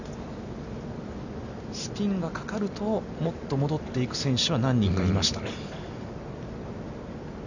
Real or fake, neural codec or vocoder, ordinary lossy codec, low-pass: real; none; none; 7.2 kHz